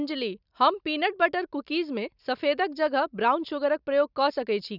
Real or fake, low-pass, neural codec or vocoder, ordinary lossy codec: real; 5.4 kHz; none; none